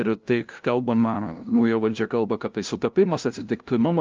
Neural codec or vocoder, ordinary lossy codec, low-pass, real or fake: codec, 16 kHz, 0.5 kbps, FunCodec, trained on LibriTTS, 25 frames a second; Opus, 24 kbps; 7.2 kHz; fake